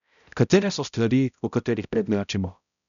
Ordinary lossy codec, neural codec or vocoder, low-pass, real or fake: none; codec, 16 kHz, 0.5 kbps, X-Codec, HuBERT features, trained on balanced general audio; 7.2 kHz; fake